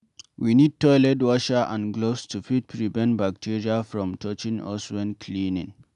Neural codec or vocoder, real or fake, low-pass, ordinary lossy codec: none; real; 10.8 kHz; none